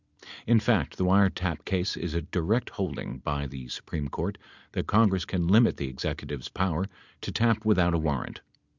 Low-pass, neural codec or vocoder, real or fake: 7.2 kHz; none; real